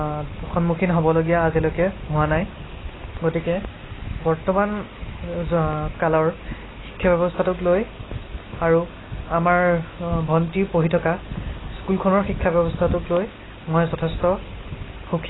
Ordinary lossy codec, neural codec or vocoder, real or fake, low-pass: AAC, 16 kbps; none; real; 7.2 kHz